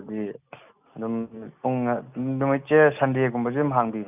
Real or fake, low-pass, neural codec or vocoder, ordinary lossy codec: real; 3.6 kHz; none; none